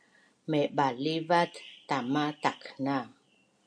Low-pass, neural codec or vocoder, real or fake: 9.9 kHz; none; real